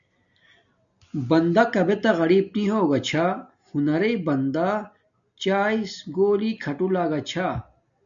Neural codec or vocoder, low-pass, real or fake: none; 7.2 kHz; real